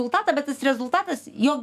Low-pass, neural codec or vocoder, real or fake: 14.4 kHz; autoencoder, 48 kHz, 128 numbers a frame, DAC-VAE, trained on Japanese speech; fake